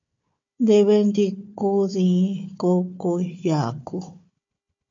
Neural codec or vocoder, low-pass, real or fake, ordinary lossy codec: codec, 16 kHz, 4 kbps, FunCodec, trained on Chinese and English, 50 frames a second; 7.2 kHz; fake; MP3, 48 kbps